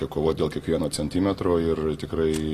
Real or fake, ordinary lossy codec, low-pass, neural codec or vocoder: real; AAC, 48 kbps; 14.4 kHz; none